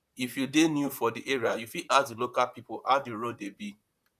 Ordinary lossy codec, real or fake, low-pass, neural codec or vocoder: none; fake; 14.4 kHz; vocoder, 44.1 kHz, 128 mel bands, Pupu-Vocoder